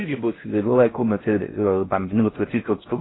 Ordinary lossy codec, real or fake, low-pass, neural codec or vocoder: AAC, 16 kbps; fake; 7.2 kHz; codec, 16 kHz in and 24 kHz out, 0.6 kbps, FocalCodec, streaming, 4096 codes